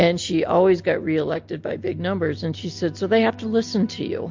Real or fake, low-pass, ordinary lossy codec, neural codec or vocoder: real; 7.2 kHz; MP3, 48 kbps; none